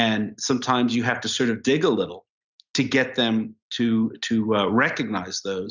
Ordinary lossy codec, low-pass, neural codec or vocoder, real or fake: Opus, 64 kbps; 7.2 kHz; none; real